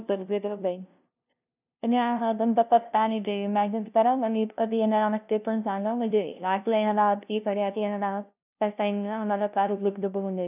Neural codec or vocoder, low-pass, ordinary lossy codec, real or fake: codec, 16 kHz, 0.5 kbps, FunCodec, trained on LibriTTS, 25 frames a second; 3.6 kHz; none; fake